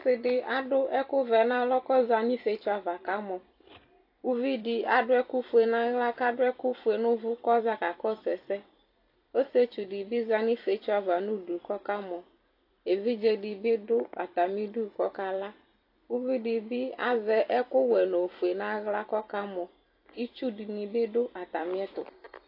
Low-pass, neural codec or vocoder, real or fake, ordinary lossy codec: 5.4 kHz; vocoder, 44.1 kHz, 128 mel bands every 256 samples, BigVGAN v2; fake; AAC, 32 kbps